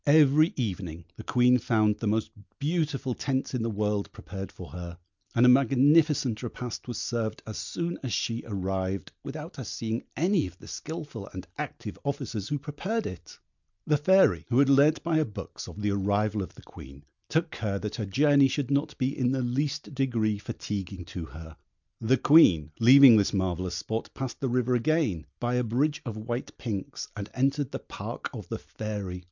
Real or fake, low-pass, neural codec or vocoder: real; 7.2 kHz; none